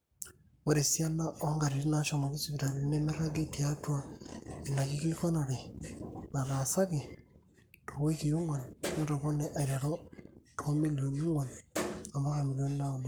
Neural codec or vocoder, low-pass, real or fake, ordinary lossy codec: codec, 44.1 kHz, 7.8 kbps, Pupu-Codec; none; fake; none